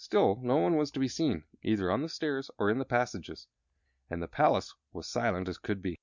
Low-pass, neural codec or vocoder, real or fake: 7.2 kHz; none; real